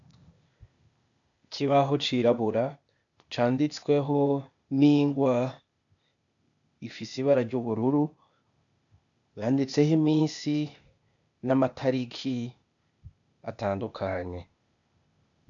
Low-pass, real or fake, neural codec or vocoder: 7.2 kHz; fake; codec, 16 kHz, 0.8 kbps, ZipCodec